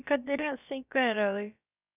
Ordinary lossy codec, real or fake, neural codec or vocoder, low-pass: none; fake; codec, 16 kHz, about 1 kbps, DyCAST, with the encoder's durations; 3.6 kHz